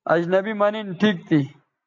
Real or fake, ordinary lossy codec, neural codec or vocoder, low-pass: real; AAC, 48 kbps; none; 7.2 kHz